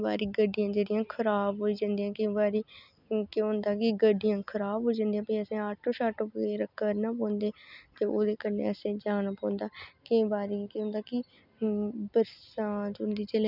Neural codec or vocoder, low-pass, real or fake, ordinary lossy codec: none; 5.4 kHz; real; none